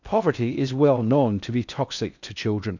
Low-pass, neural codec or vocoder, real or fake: 7.2 kHz; codec, 16 kHz in and 24 kHz out, 0.6 kbps, FocalCodec, streaming, 4096 codes; fake